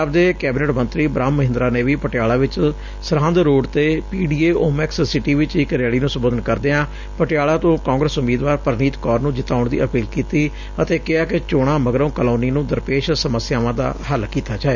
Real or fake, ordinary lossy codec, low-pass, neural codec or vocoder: real; none; 7.2 kHz; none